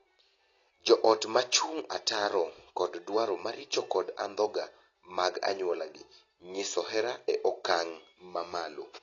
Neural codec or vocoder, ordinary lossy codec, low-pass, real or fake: none; AAC, 32 kbps; 7.2 kHz; real